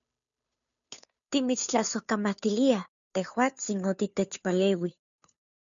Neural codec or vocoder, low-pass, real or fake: codec, 16 kHz, 2 kbps, FunCodec, trained on Chinese and English, 25 frames a second; 7.2 kHz; fake